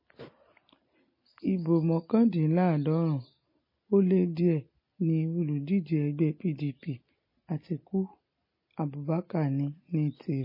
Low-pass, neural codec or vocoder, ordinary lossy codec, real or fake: 5.4 kHz; none; MP3, 24 kbps; real